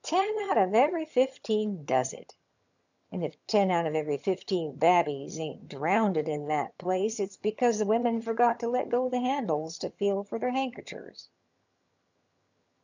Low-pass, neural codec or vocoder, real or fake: 7.2 kHz; vocoder, 22.05 kHz, 80 mel bands, HiFi-GAN; fake